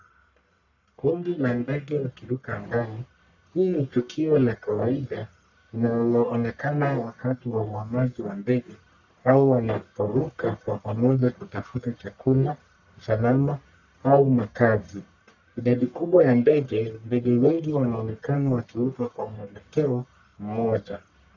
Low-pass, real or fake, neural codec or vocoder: 7.2 kHz; fake; codec, 44.1 kHz, 1.7 kbps, Pupu-Codec